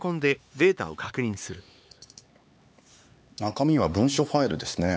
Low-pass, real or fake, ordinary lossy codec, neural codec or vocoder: none; fake; none; codec, 16 kHz, 4 kbps, X-Codec, HuBERT features, trained on LibriSpeech